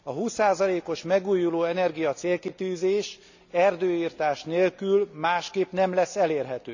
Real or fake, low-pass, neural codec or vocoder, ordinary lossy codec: real; 7.2 kHz; none; none